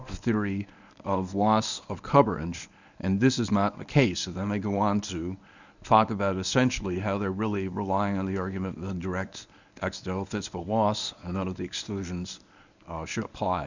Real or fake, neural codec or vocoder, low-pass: fake; codec, 24 kHz, 0.9 kbps, WavTokenizer, medium speech release version 1; 7.2 kHz